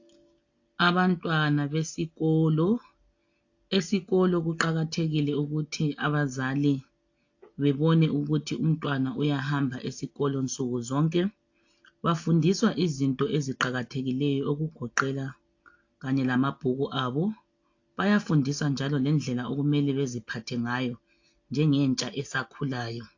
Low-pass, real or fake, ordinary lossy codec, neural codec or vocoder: 7.2 kHz; real; AAC, 48 kbps; none